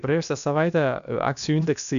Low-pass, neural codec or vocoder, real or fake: 7.2 kHz; codec, 16 kHz, about 1 kbps, DyCAST, with the encoder's durations; fake